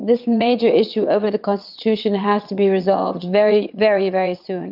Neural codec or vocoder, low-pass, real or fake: vocoder, 22.05 kHz, 80 mel bands, WaveNeXt; 5.4 kHz; fake